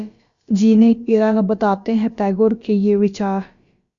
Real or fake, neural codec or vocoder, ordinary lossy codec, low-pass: fake; codec, 16 kHz, about 1 kbps, DyCAST, with the encoder's durations; Opus, 64 kbps; 7.2 kHz